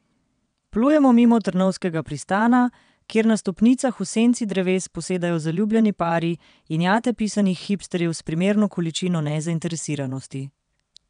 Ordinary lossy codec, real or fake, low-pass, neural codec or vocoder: none; fake; 9.9 kHz; vocoder, 22.05 kHz, 80 mel bands, WaveNeXt